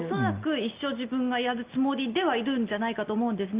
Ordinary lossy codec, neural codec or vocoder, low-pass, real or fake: Opus, 32 kbps; none; 3.6 kHz; real